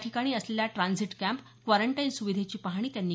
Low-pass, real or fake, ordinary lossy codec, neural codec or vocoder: none; real; none; none